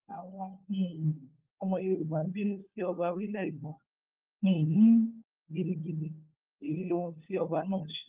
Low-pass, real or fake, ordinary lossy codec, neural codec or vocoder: 3.6 kHz; fake; Opus, 32 kbps; codec, 16 kHz, 4 kbps, FunCodec, trained on LibriTTS, 50 frames a second